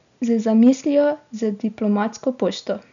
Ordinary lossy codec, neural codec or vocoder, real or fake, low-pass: none; none; real; 7.2 kHz